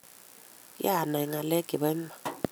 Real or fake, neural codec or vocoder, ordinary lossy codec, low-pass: real; none; none; none